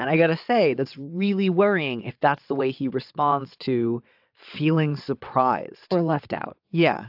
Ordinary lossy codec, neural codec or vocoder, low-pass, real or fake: AAC, 48 kbps; vocoder, 22.05 kHz, 80 mel bands, WaveNeXt; 5.4 kHz; fake